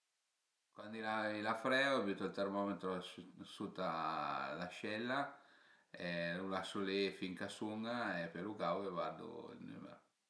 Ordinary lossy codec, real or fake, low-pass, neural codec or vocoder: none; real; none; none